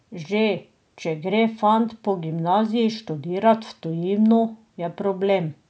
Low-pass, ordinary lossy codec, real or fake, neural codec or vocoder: none; none; real; none